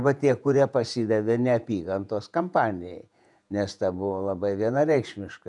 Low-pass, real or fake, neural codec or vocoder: 10.8 kHz; fake; vocoder, 48 kHz, 128 mel bands, Vocos